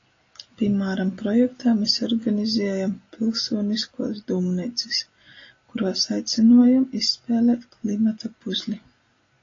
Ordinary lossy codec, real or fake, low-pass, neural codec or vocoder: AAC, 32 kbps; real; 7.2 kHz; none